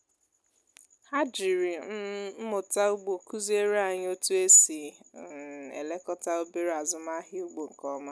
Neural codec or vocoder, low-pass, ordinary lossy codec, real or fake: none; none; none; real